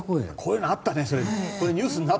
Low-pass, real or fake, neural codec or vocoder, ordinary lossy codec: none; real; none; none